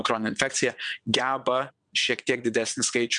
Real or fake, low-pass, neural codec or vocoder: fake; 9.9 kHz; vocoder, 22.05 kHz, 80 mel bands, WaveNeXt